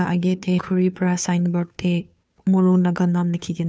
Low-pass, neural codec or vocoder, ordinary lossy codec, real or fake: none; codec, 16 kHz, 2 kbps, FunCodec, trained on Chinese and English, 25 frames a second; none; fake